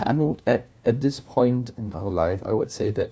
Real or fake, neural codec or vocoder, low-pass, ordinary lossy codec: fake; codec, 16 kHz, 1 kbps, FunCodec, trained on LibriTTS, 50 frames a second; none; none